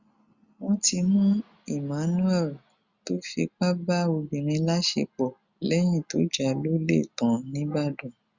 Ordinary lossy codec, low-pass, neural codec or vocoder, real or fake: Opus, 64 kbps; 7.2 kHz; none; real